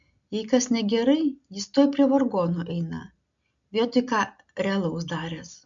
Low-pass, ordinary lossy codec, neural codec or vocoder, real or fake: 7.2 kHz; MP3, 64 kbps; none; real